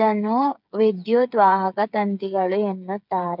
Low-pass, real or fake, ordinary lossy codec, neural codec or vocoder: 5.4 kHz; fake; none; codec, 16 kHz, 8 kbps, FreqCodec, smaller model